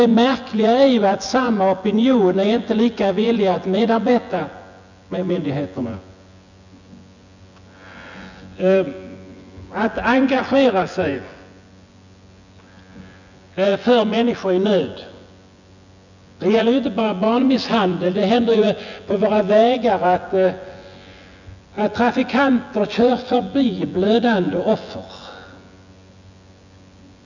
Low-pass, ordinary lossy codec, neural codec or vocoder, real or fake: 7.2 kHz; none; vocoder, 24 kHz, 100 mel bands, Vocos; fake